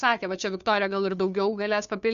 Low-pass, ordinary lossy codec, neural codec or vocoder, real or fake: 7.2 kHz; MP3, 96 kbps; codec, 16 kHz, 2 kbps, FunCodec, trained on Chinese and English, 25 frames a second; fake